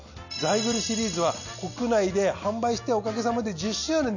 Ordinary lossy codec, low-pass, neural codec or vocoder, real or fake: none; 7.2 kHz; none; real